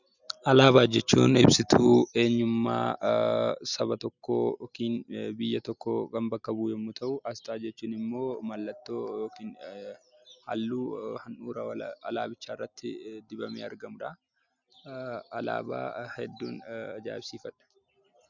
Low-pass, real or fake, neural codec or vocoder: 7.2 kHz; real; none